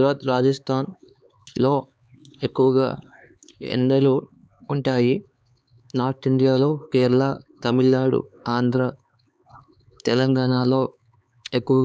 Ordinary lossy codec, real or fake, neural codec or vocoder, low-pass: none; fake; codec, 16 kHz, 4 kbps, X-Codec, HuBERT features, trained on LibriSpeech; none